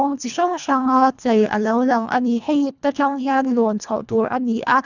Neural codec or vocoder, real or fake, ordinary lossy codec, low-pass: codec, 24 kHz, 1.5 kbps, HILCodec; fake; none; 7.2 kHz